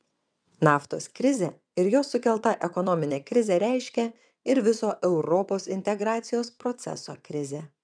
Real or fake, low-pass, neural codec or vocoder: fake; 9.9 kHz; vocoder, 22.05 kHz, 80 mel bands, WaveNeXt